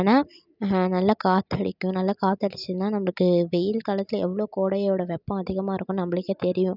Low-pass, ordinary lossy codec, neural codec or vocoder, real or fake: 5.4 kHz; none; none; real